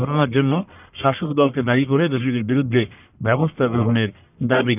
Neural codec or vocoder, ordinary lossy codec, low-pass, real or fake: codec, 44.1 kHz, 1.7 kbps, Pupu-Codec; none; 3.6 kHz; fake